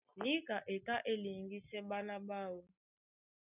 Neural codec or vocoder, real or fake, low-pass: none; real; 3.6 kHz